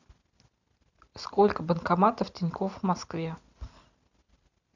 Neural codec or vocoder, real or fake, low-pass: none; real; 7.2 kHz